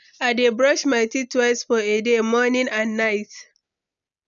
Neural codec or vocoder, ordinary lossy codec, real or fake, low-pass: none; none; real; 7.2 kHz